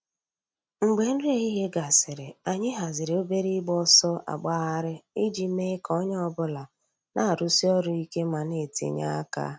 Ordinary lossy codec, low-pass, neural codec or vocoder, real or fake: none; none; none; real